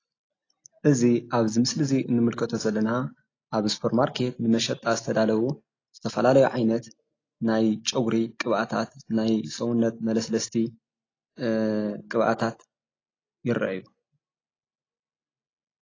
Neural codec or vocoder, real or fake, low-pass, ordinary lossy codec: none; real; 7.2 kHz; AAC, 32 kbps